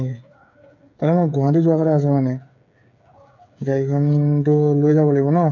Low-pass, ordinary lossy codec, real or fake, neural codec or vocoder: 7.2 kHz; none; fake; codec, 16 kHz, 8 kbps, FreqCodec, smaller model